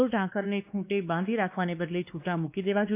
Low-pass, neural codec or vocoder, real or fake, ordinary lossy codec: 3.6 kHz; codec, 16 kHz, 2 kbps, X-Codec, WavLM features, trained on Multilingual LibriSpeech; fake; AAC, 32 kbps